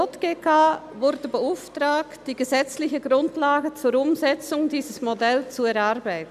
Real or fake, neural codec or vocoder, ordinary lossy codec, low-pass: real; none; none; 14.4 kHz